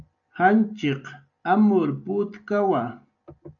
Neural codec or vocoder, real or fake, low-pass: none; real; 7.2 kHz